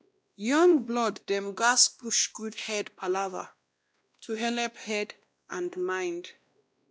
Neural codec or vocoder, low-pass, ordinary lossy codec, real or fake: codec, 16 kHz, 1 kbps, X-Codec, WavLM features, trained on Multilingual LibriSpeech; none; none; fake